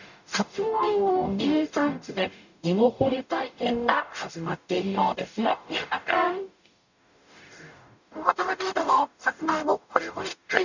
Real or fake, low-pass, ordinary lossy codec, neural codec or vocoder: fake; 7.2 kHz; none; codec, 44.1 kHz, 0.9 kbps, DAC